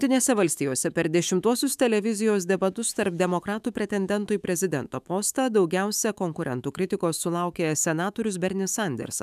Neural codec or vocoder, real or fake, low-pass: autoencoder, 48 kHz, 128 numbers a frame, DAC-VAE, trained on Japanese speech; fake; 14.4 kHz